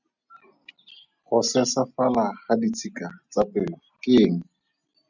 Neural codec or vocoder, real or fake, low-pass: none; real; 7.2 kHz